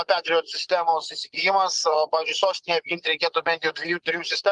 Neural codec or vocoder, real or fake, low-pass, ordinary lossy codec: vocoder, 24 kHz, 100 mel bands, Vocos; fake; 10.8 kHz; Opus, 32 kbps